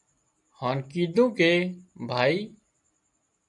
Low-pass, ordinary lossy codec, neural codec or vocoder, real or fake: 10.8 kHz; MP3, 96 kbps; none; real